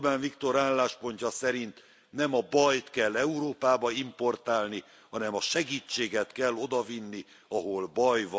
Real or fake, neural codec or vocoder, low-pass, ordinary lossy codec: real; none; none; none